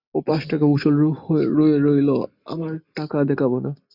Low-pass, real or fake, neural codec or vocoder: 5.4 kHz; real; none